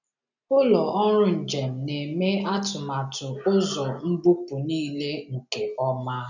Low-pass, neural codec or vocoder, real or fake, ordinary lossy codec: 7.2 kHz; none; real; none